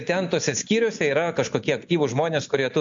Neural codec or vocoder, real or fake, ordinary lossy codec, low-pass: none; real; MP3, 48 kbps; 7.2 kHz